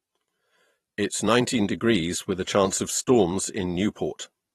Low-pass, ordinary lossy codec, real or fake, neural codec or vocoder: 19.8 kHz; AAC, 32 kbps; fake; vocoder, 44.1 kHz, 128 mel bands every 512 samples, BigVGAN v2